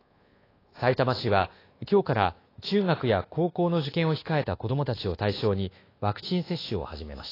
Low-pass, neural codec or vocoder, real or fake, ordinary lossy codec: 5.4 kHz; codec, 24 kHz, 1.2 kbps, DualCodec; fake; AAC, 24 kbps